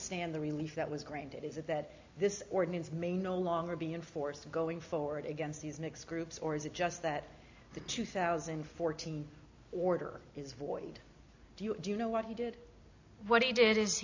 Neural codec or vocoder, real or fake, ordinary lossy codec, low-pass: none; real; AAC, 48 kbps; 7.2 kHz